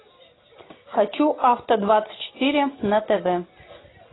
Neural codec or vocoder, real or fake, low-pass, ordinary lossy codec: none; real; 7.2 kHz; AAC, 16 kbps